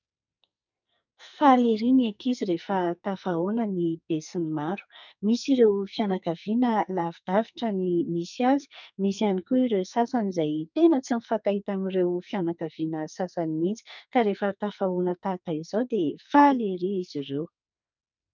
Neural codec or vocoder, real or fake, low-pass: codec, 44.1 kHz, 2.6 kbps, SNAC; fake; 7.2 kHz